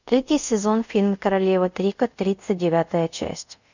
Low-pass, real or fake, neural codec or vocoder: 7.2 kHz; fake; codec, 24 kHz, 0.5 kbps, DualCodec